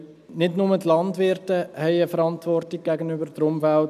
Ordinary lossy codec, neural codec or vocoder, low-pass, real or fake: none; none; 14.4 kHz; real